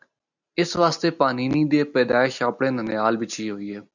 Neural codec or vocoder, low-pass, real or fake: none; 7.2 kHz; real